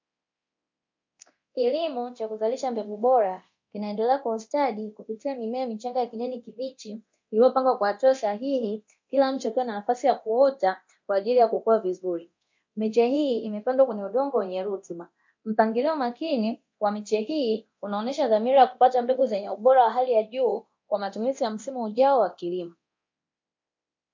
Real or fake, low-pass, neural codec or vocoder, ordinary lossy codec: fake; 7.2 kHz; codec, 24 kHz, 0.9 kbps, DualCodec; MP3, 48 kbps